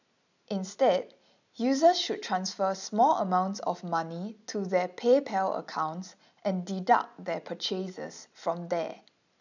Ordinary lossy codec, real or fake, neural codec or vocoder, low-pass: none; real; none; 7.2 kHz